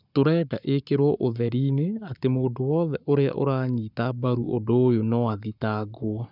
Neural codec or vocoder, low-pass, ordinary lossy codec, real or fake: codec, 44.1 kHz, 7.8 kbps, Pupu-Codec; 5.4 kHz; none; fake